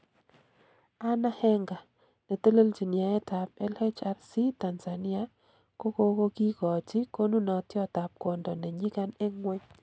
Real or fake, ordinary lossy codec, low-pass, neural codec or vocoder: real; none; none; none